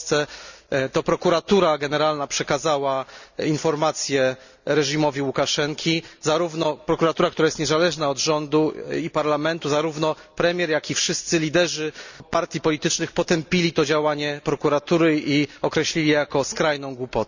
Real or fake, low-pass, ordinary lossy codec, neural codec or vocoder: real; 7.2 kHz; none; none